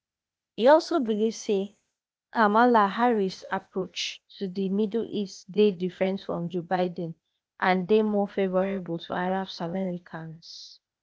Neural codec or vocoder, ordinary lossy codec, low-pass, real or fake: codec, 16 kHz, 0.8 kbps, ZipCodec; none; none; fake